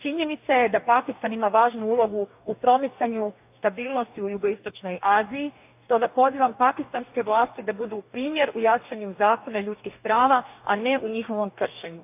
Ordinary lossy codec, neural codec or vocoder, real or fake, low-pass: AAC, 32 kbps; codec, 44.1 kHz, 2.6 kbps, DAC; fake; 3.6 kHz